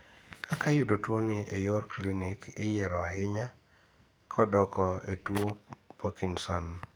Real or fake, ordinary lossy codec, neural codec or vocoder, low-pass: fake; none; codec, 44.1 kHz, 2.6 kbps, SNAC; none